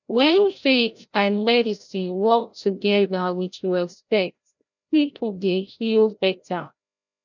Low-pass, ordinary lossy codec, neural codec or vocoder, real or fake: 7.2 kHz; none; codec, 16 kHz, 0.5 kbps, FreqCodec, larger model; fake